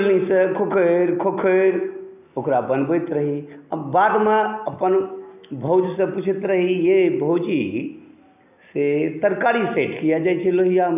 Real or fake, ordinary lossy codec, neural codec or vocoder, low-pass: real; none; none; 3.6 kHz